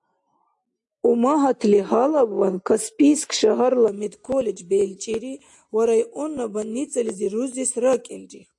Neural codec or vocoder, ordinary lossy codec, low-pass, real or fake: none; AAC, 64 kbps; 10.8 kHz; real